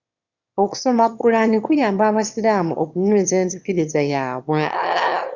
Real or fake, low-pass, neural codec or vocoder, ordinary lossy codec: fake; 7.2 kHz; autoencoder, 22.05 kHz, a latent of 192 numbers a frame, VITS, trained on one speaker; Opus, 64 kbps